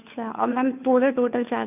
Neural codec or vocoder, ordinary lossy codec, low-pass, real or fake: codec, 16 kHz, 2 kbps, FunCodec, trained on Chinese and English, 25 frames a second; none; 3.6 kHz; fake